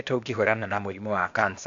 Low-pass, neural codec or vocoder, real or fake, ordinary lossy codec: 7.2 kHz; codec, 16 kHz, 0.8 kbps, ZipCodec; fake; AAC, 64 kbps